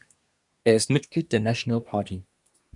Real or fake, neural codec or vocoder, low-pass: fake; codec, 24 kHz, 1 kbps, SNAC; 10.8 kHz